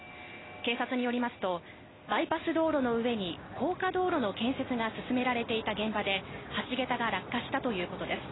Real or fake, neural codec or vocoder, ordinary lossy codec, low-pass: real; none; AAC, 16 kbps; 7.2 kHz